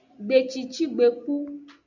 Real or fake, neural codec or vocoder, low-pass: real; none; 7.2 kHz